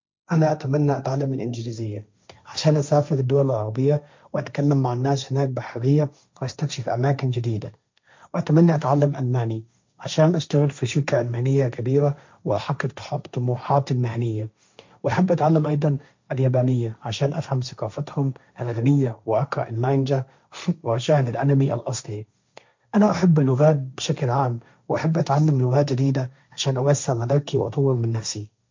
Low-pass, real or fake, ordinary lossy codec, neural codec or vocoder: 7.2 kHz; fake; MP3, 64 kbps; codec, 16 kHz, 1.1 kbps, Voila-Tokenizer